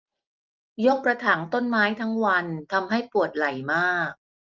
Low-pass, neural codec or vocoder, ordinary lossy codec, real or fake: 7.2 kHz; none; Opus, 32 kbps; real